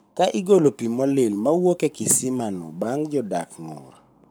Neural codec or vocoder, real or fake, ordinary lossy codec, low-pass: codec, 44.1 kHz, 7.8 kbps, Pupu-Codec; fake; none; none